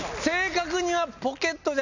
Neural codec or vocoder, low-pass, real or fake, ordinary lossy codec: none; 7.2 kHz; real; none